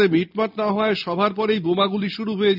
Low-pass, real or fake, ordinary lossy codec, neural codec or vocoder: 5.4 kHz; real; none; none